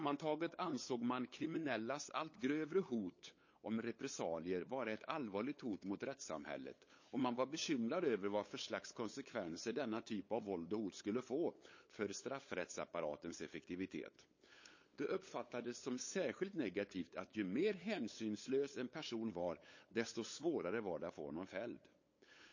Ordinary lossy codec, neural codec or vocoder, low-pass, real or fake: MP3, 32 kbps; codec, 16 kHz, 8 kbps, FunCodec, trained on LibriTTS, 25 frames a second; 7.2 kHz; fake